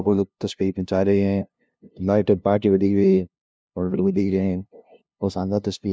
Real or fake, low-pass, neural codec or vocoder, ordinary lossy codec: fake; none; codec, 16 kHz, 0.5 kbps, FunCodec, trained on LibriTTS, 25 frames a second; none